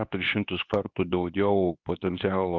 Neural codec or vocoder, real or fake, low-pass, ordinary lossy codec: codec, 24 kHz, 0.9 kbps, WavTokenizer, medium speech release version 2; fake; 7.2 kHz; Opus, 64 kbps